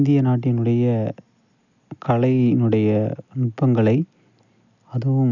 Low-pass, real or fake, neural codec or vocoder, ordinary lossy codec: 7.2 kHz; real; none; none